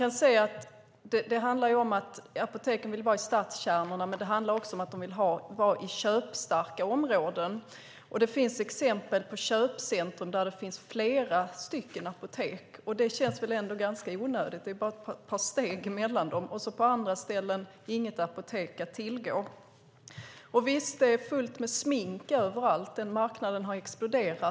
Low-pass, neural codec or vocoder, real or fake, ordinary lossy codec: none; none; real; none